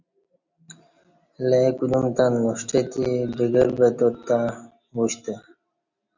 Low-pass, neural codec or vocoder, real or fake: 7.2 kHz; none; real